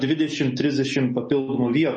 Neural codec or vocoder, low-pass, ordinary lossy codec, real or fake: none; 9.9 kHz; MP3, 32 kbps; real